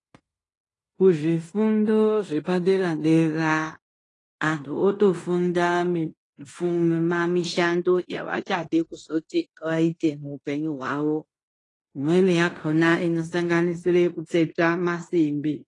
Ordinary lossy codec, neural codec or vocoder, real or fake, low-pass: AAC, 32 kbps; codec, 16 kHz in and 24 kHz out, 0.9 kbps, LongCat-Audio-Codec, fine tuned four codebook decoder; fake; 10.8 kHz